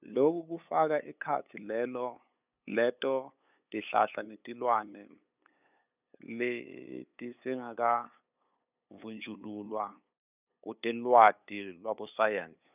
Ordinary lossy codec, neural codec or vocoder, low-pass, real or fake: none; codec, 16 kHz, 8 kbps, FunCodec, trained on LibriTTS, 25 frames a second; 3.6 kHz; fake